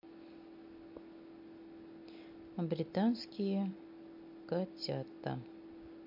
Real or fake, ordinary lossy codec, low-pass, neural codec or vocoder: real; MP3, 32 kbps; 5.4 kHz; none